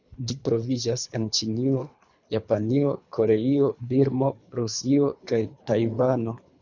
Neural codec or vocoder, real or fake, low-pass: codec, 24 kHz, 3 kbps, HILCodec; fake; 7.2 kHz